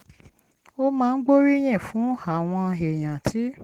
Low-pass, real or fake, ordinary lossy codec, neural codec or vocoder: 19.8 kHz; real; Opus, 16 kbps; none